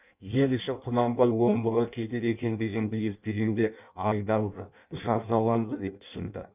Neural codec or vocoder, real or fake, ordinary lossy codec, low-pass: codec, 16 kHz in and 24 kHz out, 0.6 kbps, FireRedTTS-2 codec; fake; none; 3.6 kHz